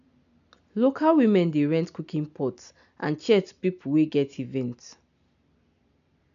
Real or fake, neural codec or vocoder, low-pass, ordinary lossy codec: real; none; 7.2 kHz; none